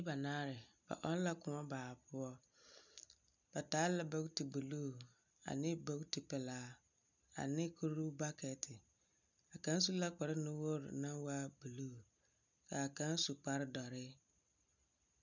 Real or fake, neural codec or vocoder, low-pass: real; none; 7.2 kHz